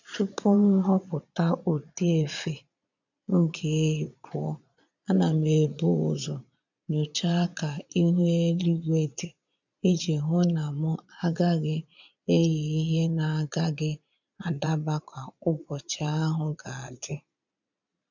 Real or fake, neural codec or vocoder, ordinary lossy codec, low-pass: fake; vocoder, 22.05 kHz, 80 mel bands, Vocos; none; 7.2 kHz